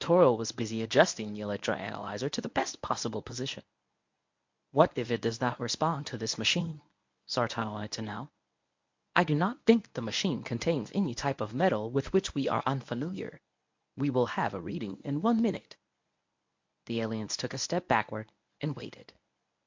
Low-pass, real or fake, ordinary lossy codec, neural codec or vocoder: 7.2 kHz; fake; MP3, 48 kbps; codec, 24 kHz, 0.9 kbps, WavTokenizer, medium speech release version 1